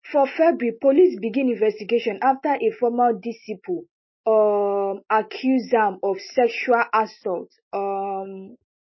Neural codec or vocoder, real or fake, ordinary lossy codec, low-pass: none; real; MP3, 24 kbps; 7.2 kHz